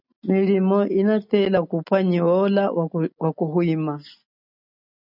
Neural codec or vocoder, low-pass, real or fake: none; 5.4 kHz; real